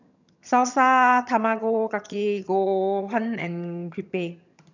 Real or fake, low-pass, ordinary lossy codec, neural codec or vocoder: fake; 7.2 kHz; none; vocoder, 22.05 kHz, 80 mel bands, HiFi-GAN